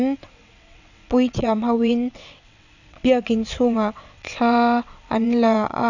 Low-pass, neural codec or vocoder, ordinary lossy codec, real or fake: 7.2 kHz; vocoder, 22.05 kHz, 80 mel bands, WaveNeXt; none; fake